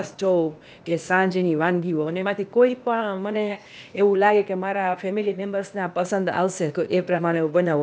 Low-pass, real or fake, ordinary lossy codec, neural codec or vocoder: none; fake; none; codec, 16 kHz, 0.8 kbps, ZipCodec